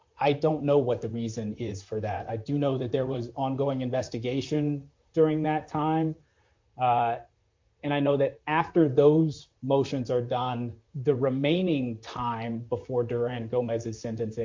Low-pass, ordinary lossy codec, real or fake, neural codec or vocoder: 7.2 kHz; MP3, 48 kbps; fake; vocoder, 44.1 kHz, 128 mel bands, Pupu-Vocoder